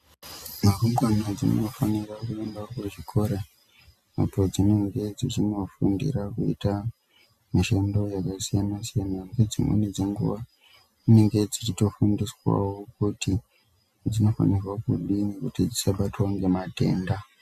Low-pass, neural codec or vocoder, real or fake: 14.4 kHz; none; real